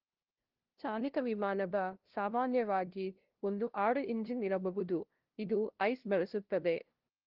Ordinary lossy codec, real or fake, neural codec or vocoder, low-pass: Opus, 16 kbps; fake; codec, 16 kHz, 0.5 kbps, FunCodec, trained on LibriTTS, 25 frames a second; 5.4 kHz